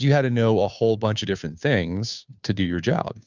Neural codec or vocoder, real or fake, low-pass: codec, 16 kHz, 2 kbps, FunCodec, trained on Chinese and English, 25 frames a second; fake; 7.2 kHz